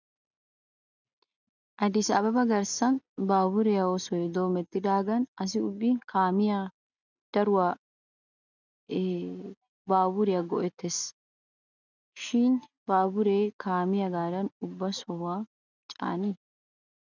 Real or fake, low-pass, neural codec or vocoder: real; 7.2 kHz; none